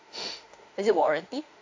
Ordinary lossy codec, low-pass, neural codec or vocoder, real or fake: AAC, 48 kbps; 7.2 kHz; autoencoder, 48 kHz, 32 numbers a frame, DAC-VAE, trained on Japanese speech; fake